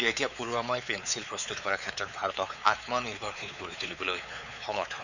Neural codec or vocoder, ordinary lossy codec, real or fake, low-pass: codec, 16 kHz, 4 kbps, X-Codec, WavLM features, trained on Multilingual LibriSpeech; none; fake; 7.2 kHz